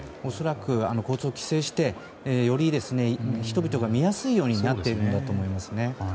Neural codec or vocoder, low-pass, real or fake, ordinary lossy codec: none; none; real; none